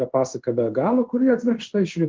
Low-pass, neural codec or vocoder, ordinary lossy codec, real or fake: 7.2 kHz; codec, 16 kHz in and 24 kHz out, 1 kbps, XY-Tokenizer; Opus, 16 kbps; fake